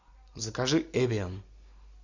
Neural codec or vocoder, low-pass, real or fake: none; 7.2 kHz; real